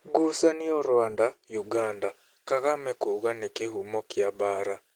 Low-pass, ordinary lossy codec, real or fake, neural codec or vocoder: 19.8 kHz; Opus, 16 kbps; real; none